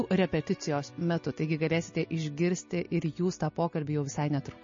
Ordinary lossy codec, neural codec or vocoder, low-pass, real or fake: MP3, 32 kbps; none; 7.2 kHz; real